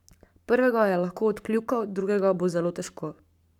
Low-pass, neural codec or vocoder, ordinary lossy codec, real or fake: 19.8 kHz; codec, 44.1 kHz, 7.8 kbps, Pupu-Codec; none; fake